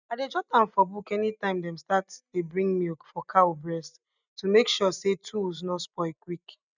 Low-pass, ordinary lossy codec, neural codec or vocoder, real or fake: 7.2 kHz; none; none; real